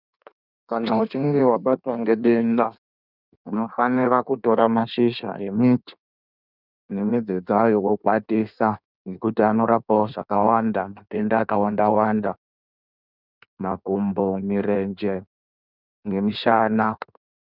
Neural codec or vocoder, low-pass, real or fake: codec, 16 kHz in and 24 kHz out, 1.1 kbps, FireRedTTS-2 codec; 5.4 kHz; fake